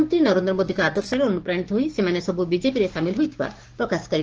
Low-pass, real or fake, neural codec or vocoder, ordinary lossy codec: 7.2 kHz; fake; codec, 16 kHz, 6 kbps, DAC; Opus, 16 kbps